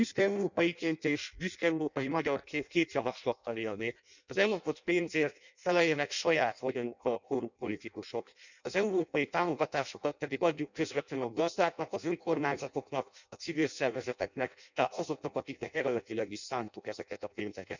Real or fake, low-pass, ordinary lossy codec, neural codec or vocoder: fake; 7.2 kHz; none; codec, 16 kHz in and 24 kHz out, 0.6 kbps, FireRedTTS-2 codec